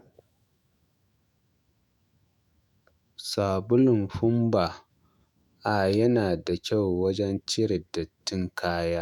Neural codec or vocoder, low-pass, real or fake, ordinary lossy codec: autoencoder, 48 kHz, 128 numbers a frame, DAC-VAE, trained on Japanese speech; 19.8 kHz; fake; none